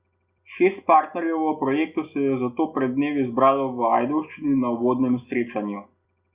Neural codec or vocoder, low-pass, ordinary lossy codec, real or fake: none; 3.6 kHz; Opus, 64 kbps; real